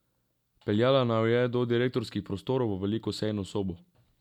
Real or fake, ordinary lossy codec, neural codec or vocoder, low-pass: real; none; none; 19.8 kHz